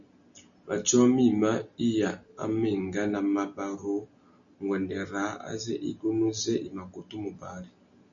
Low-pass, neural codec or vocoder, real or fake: 7.2 kHz; none; real